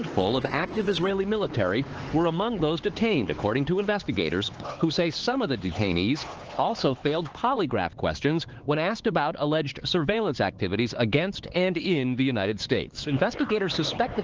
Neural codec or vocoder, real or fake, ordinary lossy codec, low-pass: codec, 16 kHz, 4 kbps, X-Codec, HuBERT features, trained on LibriSpeech; fake; Opus, 16 kbps; 7.2 kHz